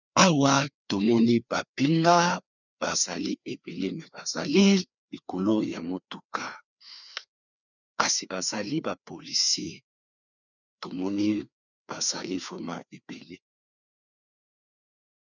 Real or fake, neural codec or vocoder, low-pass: fake; codec, 16 kHz, 2 kbps, FreqCodec, larger model; 7.2 kHz